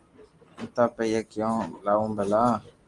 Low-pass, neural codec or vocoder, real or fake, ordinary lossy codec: 10.8 kHz; none; real; Opus, 24 kbps